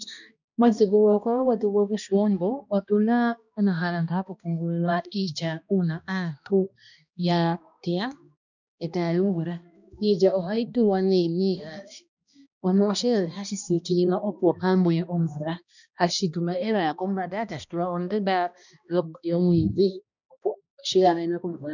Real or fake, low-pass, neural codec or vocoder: fake; 7.2 kHz; codec, 16 kHz, 1 kbps, X-Codec, HuBERT features, trained on balanced general audio